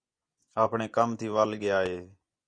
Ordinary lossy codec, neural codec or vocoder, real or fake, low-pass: Opus, 64 kbps; vocoder, 24 kHz, 100 mel bands, Vocos; fake; 9.9 kHz